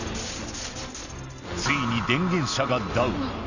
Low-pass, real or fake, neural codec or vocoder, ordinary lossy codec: 7.2 kHz; real; none; none